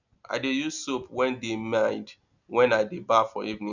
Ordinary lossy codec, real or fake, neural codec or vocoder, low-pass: none; real; none; 7.2 kHz